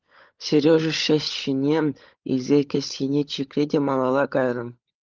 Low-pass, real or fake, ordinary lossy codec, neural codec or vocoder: 7.2 kHz; fake; Opus, 32 kbps; codec, 16 kHz, 16 kbps, FunCodec, trained on LibriTTS, 50 frames a second